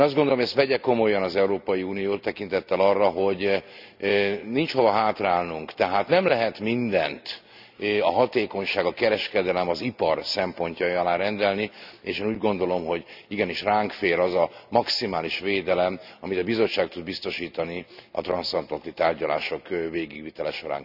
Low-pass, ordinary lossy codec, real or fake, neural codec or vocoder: 5.4 kHz; none; real; none